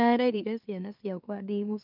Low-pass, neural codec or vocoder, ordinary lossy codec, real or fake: 5.4 kHz; autoencoder, 44.1 kHz, a latent of 192 numbers a frame, MeloTTS; none; fake